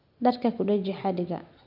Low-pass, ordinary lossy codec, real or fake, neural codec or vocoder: 5.4 kHz; none; real; none